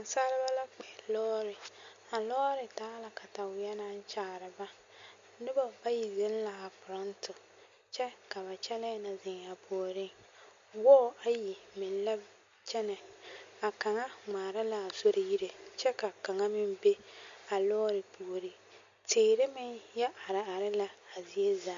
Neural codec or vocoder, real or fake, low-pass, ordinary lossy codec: none; real; 7.2 kHz; MP3, 48 kbps